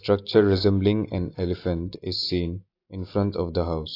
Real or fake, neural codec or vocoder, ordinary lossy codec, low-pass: real; none; AAC, 32 kbps; 5.4 kHz